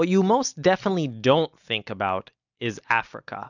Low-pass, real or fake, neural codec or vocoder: 7.2 kHz; real; none